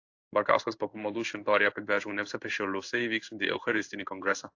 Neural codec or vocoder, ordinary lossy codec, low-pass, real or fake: codec, 16 kHz in and 24 kHz out, 1 kbps, XY-Tokenizer; AAC, 48 kbps; 7.2 kHz; fake